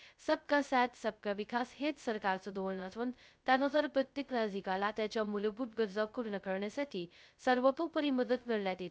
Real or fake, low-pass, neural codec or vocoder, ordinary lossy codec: fake; none; codec, 16 kHz, 0.2 kbps, FocalCodec; none